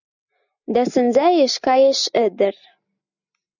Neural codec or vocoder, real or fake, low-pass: none; real; 7.2 kHz